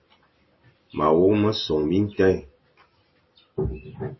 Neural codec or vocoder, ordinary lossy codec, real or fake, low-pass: none; MP3, 24 kbps; real; 7.2 kHz